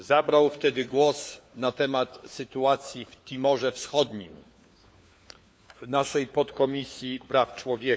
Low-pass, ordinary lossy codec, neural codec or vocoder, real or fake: none; none; codec, 16 kHz, 4 kbps, FunCodec, trained on LibriTTS, 50 frames a second; fake